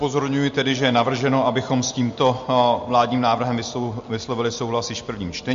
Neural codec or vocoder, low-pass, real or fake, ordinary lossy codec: none; 7.2 kHz; real; MP3, 48 kbps